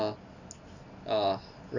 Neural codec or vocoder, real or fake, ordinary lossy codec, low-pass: none; real; none; 7.2 kHz